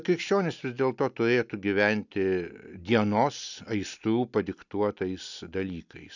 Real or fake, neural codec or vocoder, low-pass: real; none; 7.2 kHz